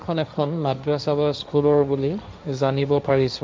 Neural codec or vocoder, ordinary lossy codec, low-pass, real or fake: codec, 16 kHz, 1.1 kbps, Voila-Tokenizer; none; none; fake